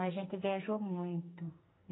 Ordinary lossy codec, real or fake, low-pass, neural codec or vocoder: AAC, 16 kbps; fake; 7.2 kHz; codec, 44.1 kHz, 2.6 kbps, SNAC